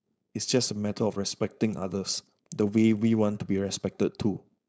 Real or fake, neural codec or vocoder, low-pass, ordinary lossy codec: fake; codec, 16 kHz, 4.8 kbps, FACodec; none; none